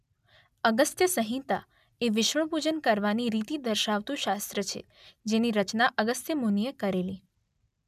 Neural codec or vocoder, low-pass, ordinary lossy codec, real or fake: none; 14.4 kHz; none; real